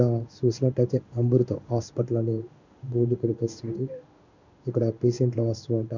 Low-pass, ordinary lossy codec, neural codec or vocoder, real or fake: 7.2 kHz; none; codec, 16 kHz in and 24 kHz out, 1 kbps, XY-Tokenizer; fake